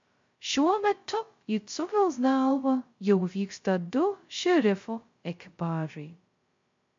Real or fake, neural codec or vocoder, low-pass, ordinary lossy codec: fake; codec, 16 kHz, 0.2 kbps, FocalCodec; 7.2 kHz; MP3, 48 kbps